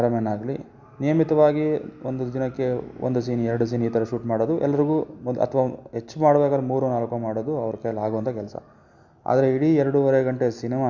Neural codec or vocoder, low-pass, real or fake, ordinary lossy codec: none; 7.2 kHz; real; Opus, 64 kbps